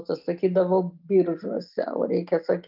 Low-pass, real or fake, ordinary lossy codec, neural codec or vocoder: 5.4 kHz; real; Opus, 24 kbps; none